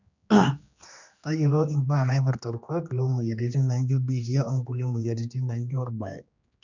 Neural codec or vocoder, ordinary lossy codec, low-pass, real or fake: codec, 16 kHz, 2 kbps, X-Codec, HuBERT features, trained on general audio; none; 7.2 kHz; fake